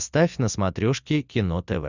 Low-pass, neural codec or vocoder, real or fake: 7.2 kHz; none; real